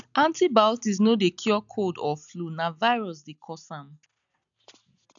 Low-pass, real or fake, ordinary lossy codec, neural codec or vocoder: 7.2 kHz; real; none; none